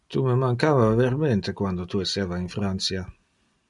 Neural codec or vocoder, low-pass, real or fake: none; 10.8 kHz; real